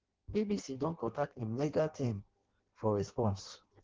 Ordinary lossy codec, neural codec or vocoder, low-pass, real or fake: Opus, 16 kbps; codec, 16 kHz in and 24 kHz out, 0.6 kbps, FireRedTTS-2 codec; 7.2 kHz; fake